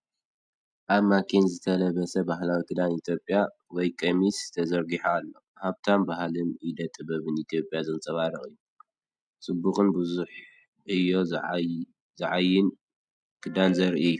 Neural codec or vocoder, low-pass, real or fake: none; 9.9 kHz; real